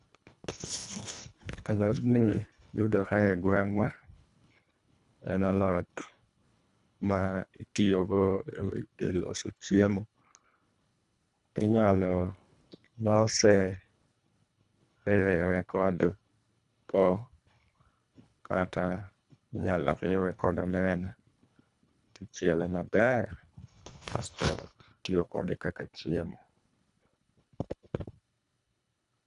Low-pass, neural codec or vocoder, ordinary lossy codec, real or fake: 10.8 kHz; codec, 24 kHz, 1.5 kbps, HILCodec; none; fake